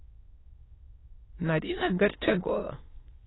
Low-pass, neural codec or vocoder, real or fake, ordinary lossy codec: 7.2 kHz; autoencoder, 22.05 kHz, a latent of 192 numbers a frame, VITS, trained on many speakers; fake; AAC, 16 kbps